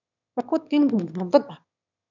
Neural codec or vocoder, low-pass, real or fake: autoencoder, 22.05 kHz, a latent of 192 numbers a frame, VITS, trained on one speaker; 7.2 kHz; fake